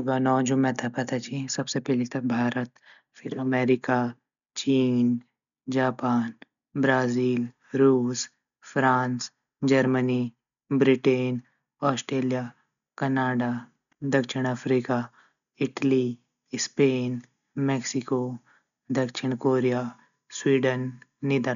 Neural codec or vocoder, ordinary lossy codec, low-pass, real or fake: none; none; 7.2 kHz; real